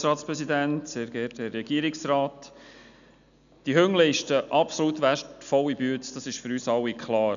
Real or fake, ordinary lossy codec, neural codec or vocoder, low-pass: real; none; none; 7.2 kHz